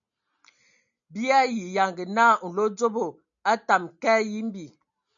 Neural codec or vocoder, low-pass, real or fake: none; 7.2 kHz; real